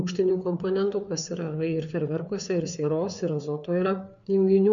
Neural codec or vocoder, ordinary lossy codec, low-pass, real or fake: codec, 16 kHz, 4 kbps, FreqCodec, larger model; MP3, 96 kbps; 7.2 kHz; fake